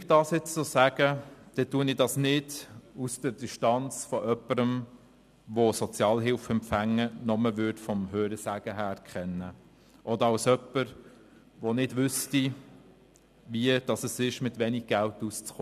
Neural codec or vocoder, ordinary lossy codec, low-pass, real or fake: none; none; 14.4 kHz; real